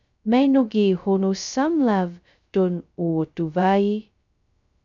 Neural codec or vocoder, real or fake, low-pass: codec, 16 kHz, 0.2 kbps, FocalCodec; fake; 7.2 kHz